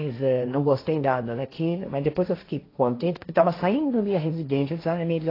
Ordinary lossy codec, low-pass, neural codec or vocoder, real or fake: AAC, 24 kbps; 5.4 kHz; codec, 16 kHz, 1.1 kbps, Voila-Tokenizer; fake